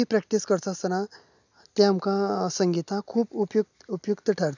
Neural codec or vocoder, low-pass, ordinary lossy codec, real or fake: none; 7.2 kHz; none; real